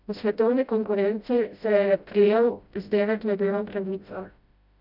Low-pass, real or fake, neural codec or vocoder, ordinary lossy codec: 5.4 kHz; fake; codec, 16 kHz, 0.5 kbps, FreqCodec, smaller model; none